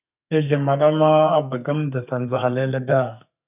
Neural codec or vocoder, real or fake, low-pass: codec, 32 kHz, 1.9 kbps, SNAC; fake; 3.6 kHz